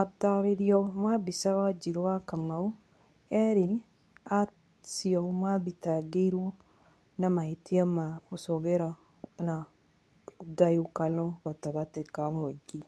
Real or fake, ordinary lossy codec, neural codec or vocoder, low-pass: fake; none; codec, 24 kHz, 0.9 kbps, WavTokenizer, medium speech release version 2; none